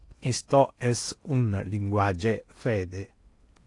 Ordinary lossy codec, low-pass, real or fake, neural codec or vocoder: AAC, 48 kbps; 10.8 kHz; fake; codec, 16 kHz in and 24 kHz out, 0.8 kbps, FocalCodec, streaming, 65536 codes